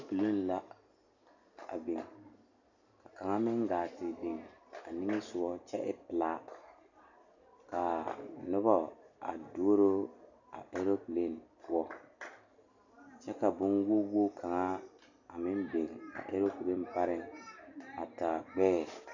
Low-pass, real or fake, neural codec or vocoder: 7.2 kHz; real; none